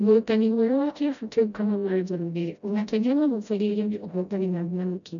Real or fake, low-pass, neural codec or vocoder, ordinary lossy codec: fake; 7.2 kHz; codec, 16 kHz, 0.5 kbps, FreqCodec, smaller model; none